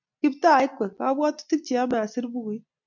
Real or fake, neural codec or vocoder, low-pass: real; none; 7.2 kHz